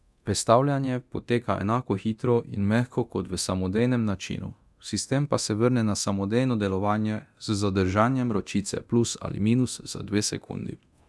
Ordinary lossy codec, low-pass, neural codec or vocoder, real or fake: none; none; codec, 24 kHz, 0.9 kbps, DualCodec; fake